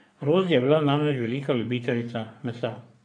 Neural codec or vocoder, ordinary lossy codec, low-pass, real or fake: codec, 44.1 kHz, 3.4 kbps, Pupu-Codec; none; 9.9 kHz; fake